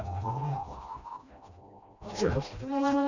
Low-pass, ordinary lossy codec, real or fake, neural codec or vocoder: 7.2 kHz; none; fake; codec, 16 kHz, 1 kbps, FreqCodec, smaller model